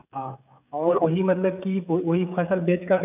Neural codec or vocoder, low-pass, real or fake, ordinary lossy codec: codec, 16 kHz, 16 kbps, FreqCodec, smaller model; 3.6 kHz; fake; none